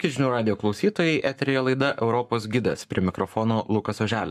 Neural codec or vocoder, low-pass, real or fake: codec, 44.1 kHz, 7.8 kbps, Pupu-Codec; 14.4 kHz; fake